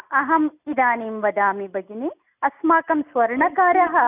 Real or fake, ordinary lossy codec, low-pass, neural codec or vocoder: real; none; 3.6 kHz; none